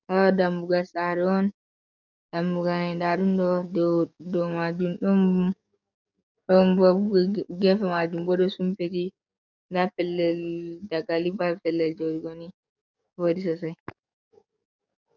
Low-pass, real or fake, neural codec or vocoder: 7.2 kHz; fake; codec, 44.1 kHz, 7.8 kbps, DAC